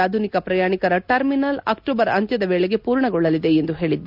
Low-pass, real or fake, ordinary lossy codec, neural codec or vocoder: 5.4 kHz; real; none; none